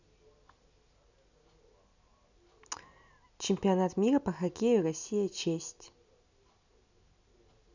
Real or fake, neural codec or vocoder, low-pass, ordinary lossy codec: real; none; 7.2 kHz; none